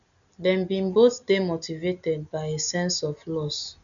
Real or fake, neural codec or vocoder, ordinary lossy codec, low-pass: real; none; none; 7.2 kHz